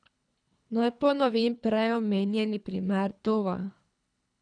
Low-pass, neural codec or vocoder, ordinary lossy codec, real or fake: 9.9 kHz; codec, 24 kHz, 3 kbps, HILCodec; none; fake